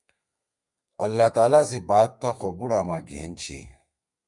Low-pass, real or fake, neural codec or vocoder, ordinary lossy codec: 10.8 kHz; fake; codec, 32 kHz, 1.9 kbps, SNAC; MP3, 96 kbps